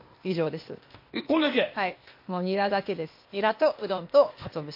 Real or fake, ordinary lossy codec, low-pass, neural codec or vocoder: fake; MP3, 32 kbps; 5.4 kHz; codec, 16 kHz, 0.8 kbps, ZipCodec